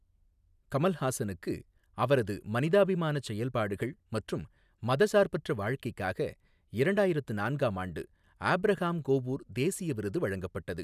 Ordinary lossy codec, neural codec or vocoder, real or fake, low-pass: none; none; real; 14.4 kHz